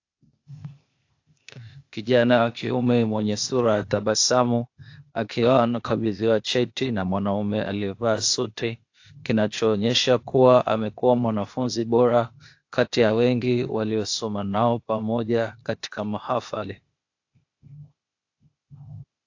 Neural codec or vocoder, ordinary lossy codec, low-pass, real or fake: codec, 16 kHz, 0.8 kbps, ZipCodec; AAC, 48 kbps; 7.2 kHz; fake